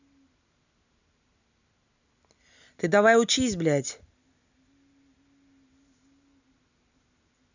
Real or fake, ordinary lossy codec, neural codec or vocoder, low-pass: real; none; none; 7.2 kHz